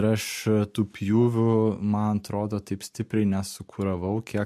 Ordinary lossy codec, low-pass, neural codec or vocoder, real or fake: MP3, 64 kbps; 14.4 kHz; none; real